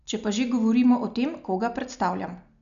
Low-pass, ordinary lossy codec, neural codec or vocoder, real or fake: 7.2 kHz; none; none; real